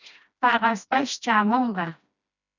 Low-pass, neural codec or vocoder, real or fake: 7.2 kHz; codec, 16 kHz, 1 kbps, FreqCodec, smaller model; fake